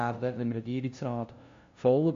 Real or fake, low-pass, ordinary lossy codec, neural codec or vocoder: fake; 7.2 kHz; AAC, 48 kbps; codec, 16 kHz, 0.5 kbps, FunCodec, trained on LibriTTS, 25 frames a second